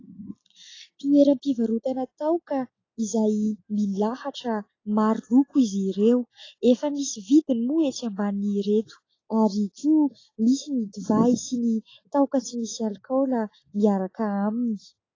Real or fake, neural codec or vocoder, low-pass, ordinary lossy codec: real; none; 7.2 kHz; AAC, 32 kbps